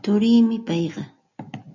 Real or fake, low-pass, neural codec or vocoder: real; 7.2 kHz; none